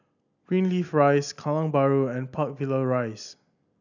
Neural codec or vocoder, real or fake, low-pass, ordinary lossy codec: none; real; 7.2 kHz; none